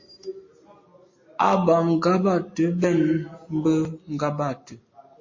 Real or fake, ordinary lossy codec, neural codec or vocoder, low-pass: real; MP3, 32 kbps; none; 7.2 kHz